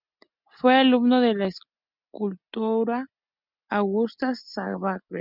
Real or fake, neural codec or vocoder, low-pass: real; none; 5.4 kHz